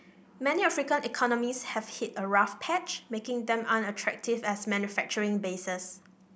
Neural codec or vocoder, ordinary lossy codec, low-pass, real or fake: none; none; none; real